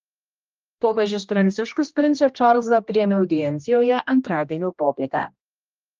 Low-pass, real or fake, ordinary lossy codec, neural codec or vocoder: 7.2 kHz; fake; Opus, 24 kbps; codec, 16 kHz, 1 kbps, X-Codec, HuBERT features, trained on general audio